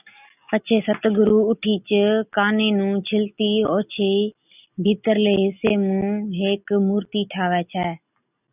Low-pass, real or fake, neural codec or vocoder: 3.6 kHz; real; none